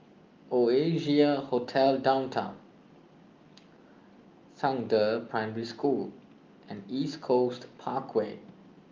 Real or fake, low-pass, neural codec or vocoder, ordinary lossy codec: real; 7.2 kHz; none; Opus, 24 kbps